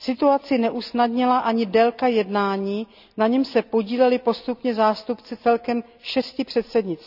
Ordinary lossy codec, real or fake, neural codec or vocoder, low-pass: none; real; none; 5.4 kHz